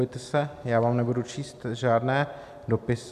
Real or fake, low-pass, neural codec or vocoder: real; 14.4 kHz; none